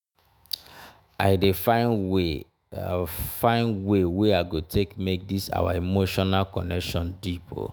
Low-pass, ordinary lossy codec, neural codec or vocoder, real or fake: none; none; autoencoder, 48 kHz, 128 numbers a frame, DAC-VAE, trained on Japanese speech; fake